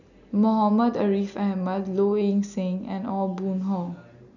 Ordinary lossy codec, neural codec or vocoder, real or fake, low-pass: none; none; real; 7.2 kHz